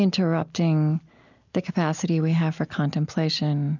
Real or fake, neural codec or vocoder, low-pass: real; none; 7.2 kHz